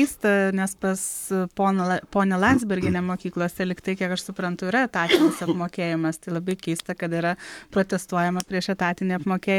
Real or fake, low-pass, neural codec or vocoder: fake; 19.8 kHz; codec, 44.1 kHz, 7.8 kbps, Pupu-Codec